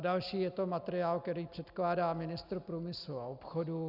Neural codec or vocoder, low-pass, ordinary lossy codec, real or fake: none; 5.4 kHz; Opus, 64 kbps; real